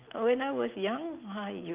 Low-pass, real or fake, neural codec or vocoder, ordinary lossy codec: 3.6 kHz; real; none; Opus, 24 kbps